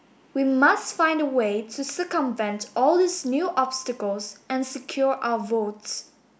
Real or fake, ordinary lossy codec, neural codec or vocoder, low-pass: real; none; none; none